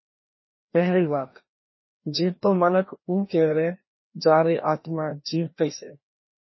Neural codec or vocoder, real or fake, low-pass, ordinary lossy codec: codec, 16 kHz, 1 kbps, FreqCodec, larger model; fake; 7.2 kHz; MP3, 24 kbps